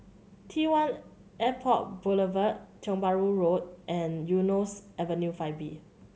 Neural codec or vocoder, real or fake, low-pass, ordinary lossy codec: none; real; none; none